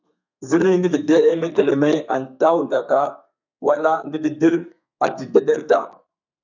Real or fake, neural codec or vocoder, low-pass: fake; codec, 32 kHz, 1.9 kbps, SNAC; 7.2 kHz